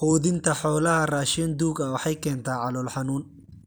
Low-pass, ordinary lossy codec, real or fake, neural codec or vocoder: none; none; real; none